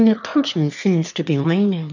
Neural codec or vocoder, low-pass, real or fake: autoencoder, 22.05 kHz, a latent of 192 numbers a frame, VITS, trained on one speaker; 7.2 kHz; fake